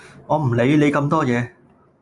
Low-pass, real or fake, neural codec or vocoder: 10.8 kHz; real; none